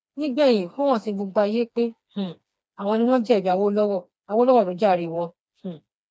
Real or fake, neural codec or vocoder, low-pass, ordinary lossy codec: fake; codec, 16 kHz, 2 kbps, FreqCodec, smaller model; none; none